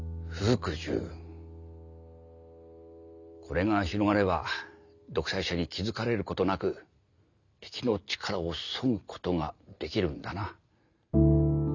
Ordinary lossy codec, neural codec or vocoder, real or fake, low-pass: none; none; real; 7.2 kHz